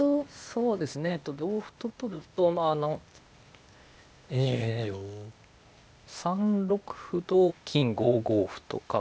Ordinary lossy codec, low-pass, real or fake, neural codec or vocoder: none; none; fake; codec, 16 kHz, 0.8 kbps, ZipCodec